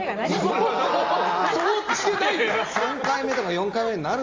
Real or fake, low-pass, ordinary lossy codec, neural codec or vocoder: real; 7.2 kHz; Opus, 32 kbps; none